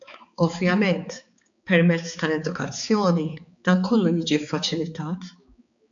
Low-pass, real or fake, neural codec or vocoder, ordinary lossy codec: 7.2 kHz; fake; codec, 16 kHz, 4 kbps, X-Codec, HuBERT features, trained on balanced general audio; AAC, 64 kbps